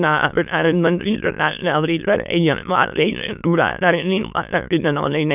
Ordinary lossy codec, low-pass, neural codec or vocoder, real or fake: none; 3.6 kHz; autoencoder, 22.05 kHz, a latent of 192 numbers a frame, VITS, trained on many speakers; fake